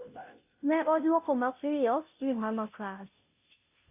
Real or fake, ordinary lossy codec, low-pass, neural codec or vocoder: fake; none; 3.6 kHz; codec, 16 kHz, 0.5 kbps, FunCodec, trained on Chinese and English, 25 frames a second